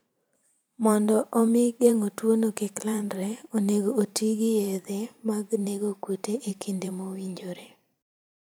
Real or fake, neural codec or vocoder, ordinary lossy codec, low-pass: fake; vocoder, 44.1 kHz, 128 mel bands every 512 samples, BigVGAN v2; none; none